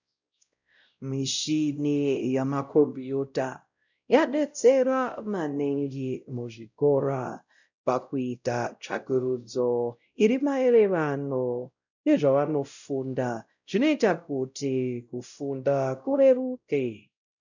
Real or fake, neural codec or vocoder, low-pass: fake; codec, 16 kHz, 0.5 kbps, X-Codec, WavLM features, trained on Multilingual LibriSpeech; 7.2 kHz